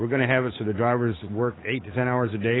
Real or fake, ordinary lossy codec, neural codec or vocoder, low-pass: fake; AAC, 16 kbps; codec, 16 kHz, 8 kbps, FunCodec, trained on Chinese and English, 25 frames a second; 7.2 kHz